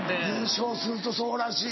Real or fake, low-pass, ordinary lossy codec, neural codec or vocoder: real; 7.2 kHz; MP3, 24 kbps; none